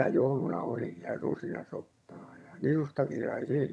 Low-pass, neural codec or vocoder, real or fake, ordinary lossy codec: none; vocoder, 22.05 kHz, 80 mel bands, HiFi-GAN; fake; none